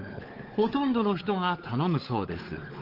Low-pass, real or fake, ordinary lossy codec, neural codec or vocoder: 5.4 kHz; fake; Opus, 16 kbps; codec, 16 kHz, 4 kbps, X-Codec, WavLM features, trained on Multilingual LibriSpeech